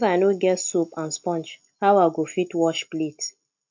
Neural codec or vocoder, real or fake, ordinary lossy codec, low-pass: none; real; MP3, 48 kbps; 7.2 kHz